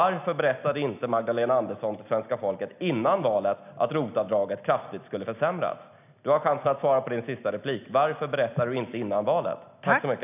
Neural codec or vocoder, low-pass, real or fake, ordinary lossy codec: none; 3.6 kHz; real; none